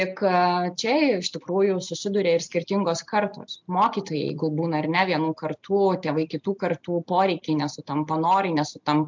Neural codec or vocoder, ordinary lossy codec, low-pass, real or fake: none; MP3, 64 kbps; 7.2 kHz; real